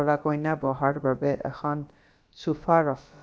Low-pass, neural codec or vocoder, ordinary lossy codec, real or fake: none; codec, 16 kHz, about 1 kbps, DyCAST, with the encoder's durations; none; fake